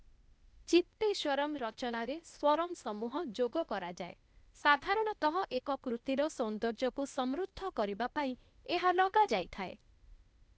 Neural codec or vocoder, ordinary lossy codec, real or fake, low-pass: codec, 16 kHz, 0.8 kbps, ZipCodec; none; fake; none